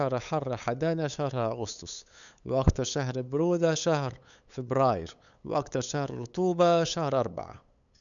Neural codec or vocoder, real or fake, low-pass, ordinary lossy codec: codec, 16 kHz, 8 kbps, FunCodec, trained on LibriTTS, 25 frames a second; fake; 7.2 kHz; none